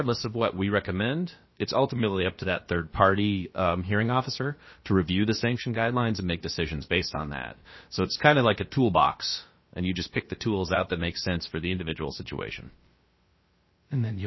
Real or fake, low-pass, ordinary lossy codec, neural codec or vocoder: fake; 7.2 kHz; MP3, 24 kbps; codec, 16 kHz, about 1 kbps, DyCAST, with the encoder's durations